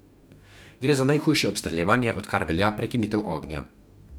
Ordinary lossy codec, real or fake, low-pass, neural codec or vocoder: none; fake; none; codec, 44.1 kHz, 2.6 kbps, DAC